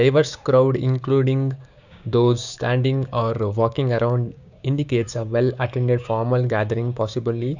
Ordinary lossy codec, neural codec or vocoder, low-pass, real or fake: none; codec, 16 kHz, 6 kbps, DAC; 7.2 kHz; fake